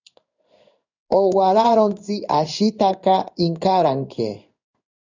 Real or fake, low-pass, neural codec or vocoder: fake; 7.2 kHz; codec, 16 kHz in and 24 kHz out, 1 kbps, XY-Tokenizer